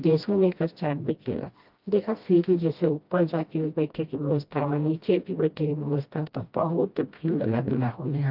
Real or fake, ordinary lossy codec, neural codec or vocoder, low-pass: fake; Opus, 32 kbps; codec, 16 kHz, 1 kbps, FreqCodec, smaller model; 5.4 kHz